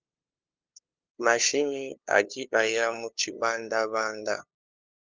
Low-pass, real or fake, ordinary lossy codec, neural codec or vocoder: 7.2 kHz; fake; Opus, 32 kbps; codec, 16 kHz, 2 kbps, FunCodec, trained on LibriTTS, 25 frames a second